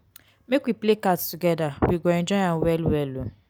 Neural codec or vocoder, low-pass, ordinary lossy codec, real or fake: none; none; none; real